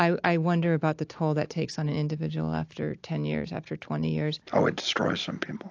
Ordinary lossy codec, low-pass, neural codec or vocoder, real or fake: AAC, 48 kbps; 7.2 kHz; none; real